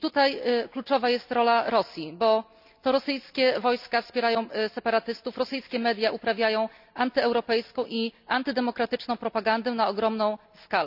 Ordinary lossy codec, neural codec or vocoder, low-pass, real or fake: none; none; 5.4 kHz; real